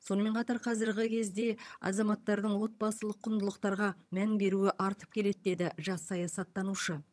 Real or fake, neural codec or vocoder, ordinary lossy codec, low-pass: fake; vocoder, 22.05 kHz, 80 mel bands, HiFi-GAN; none; none